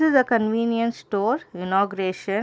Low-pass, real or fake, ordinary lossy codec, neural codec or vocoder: none; real; none; none